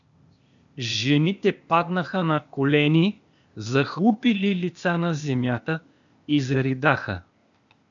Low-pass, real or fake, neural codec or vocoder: 7.2 kHz; fake; codec, 16 kHz, 0.8 kbps, ZipCodec